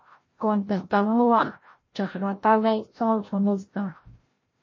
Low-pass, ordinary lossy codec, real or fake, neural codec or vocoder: 7.2 kHz; MP3, 32 kbps; fake; codec, 16 kHz, 0.5 kbps, FreqCodec, larger model